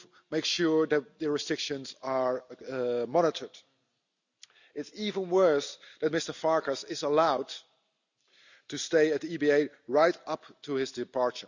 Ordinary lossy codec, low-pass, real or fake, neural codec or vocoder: none; 7.2 kHz; real; none